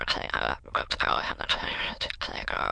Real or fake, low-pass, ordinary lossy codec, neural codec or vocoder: fake; 9.9 kHz; MP3, 64 kbps; autoencoder, 22.05 kHz, a latent of 192 numbers a frame, VITS, trained on many speakers